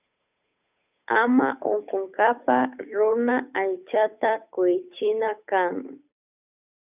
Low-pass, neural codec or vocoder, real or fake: 3.6 kHz; codec, 16 kHz, 8 kbps, FunCodec, trained on Chinese and English, 25 frames a second; fake